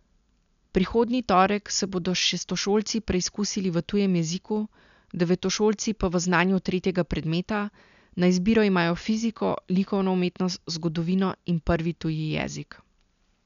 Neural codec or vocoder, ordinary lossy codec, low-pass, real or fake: none; none; 7.2 kHz; real